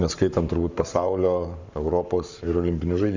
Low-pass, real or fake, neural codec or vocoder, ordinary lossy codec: 7.2 kHz; fake; codec, 44.1 kHz, 7.8 kbps, Pupu-Codec; Opus, 64 kbps